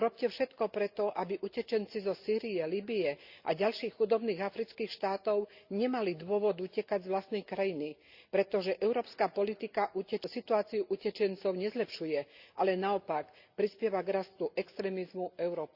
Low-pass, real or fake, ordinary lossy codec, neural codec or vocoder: 5.4 kHz; real; Opus, 64 kbps; none